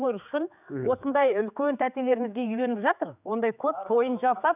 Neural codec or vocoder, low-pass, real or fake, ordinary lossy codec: codec, 16 kHz, 4 kbps, X-Codec, HuBERT features, trained on general audio; 3.6 kHz; fake; none